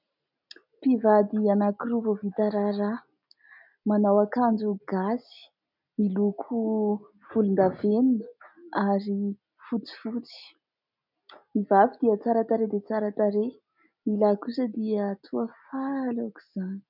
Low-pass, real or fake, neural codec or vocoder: 5.4 kHz; real; none